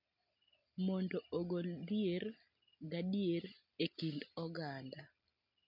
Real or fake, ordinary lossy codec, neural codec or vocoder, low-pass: real; none; none; 5.4 kHz